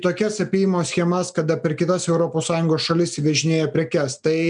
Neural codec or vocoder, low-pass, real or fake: none; 9.9 kHz; real